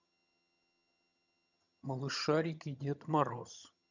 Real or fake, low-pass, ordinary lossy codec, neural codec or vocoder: fake; 7.2 kHz; none; vocoder, 22.05 kHz, 80 mel bands, HiFi-GAN